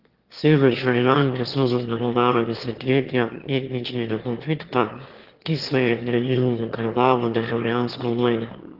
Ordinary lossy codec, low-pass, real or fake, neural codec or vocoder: Opus, 16 kbps; 5.4 kHz; fake; autoencoder, 22.05 kHz, a latent of 192 numbers a frame, VITS, trained on one speaker